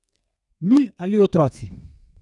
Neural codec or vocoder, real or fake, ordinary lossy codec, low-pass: codec, 32 kHz, 1.9 kbps, SNAC; fake; none; 10.8 kHz